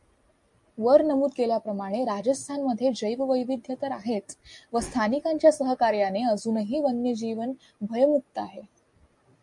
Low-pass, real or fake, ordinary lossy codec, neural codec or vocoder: 10.8 kHz; real; MP3, 64 kbps; none